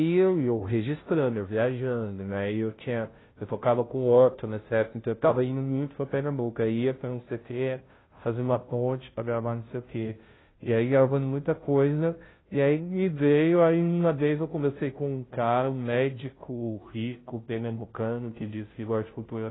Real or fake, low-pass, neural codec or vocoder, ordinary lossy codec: fake; 7.2 kHz; codec, 16 kHz, 0.5 kbps, FunCodec, trained on Chinese and English, 25 frames a second; AAC, 16 kbps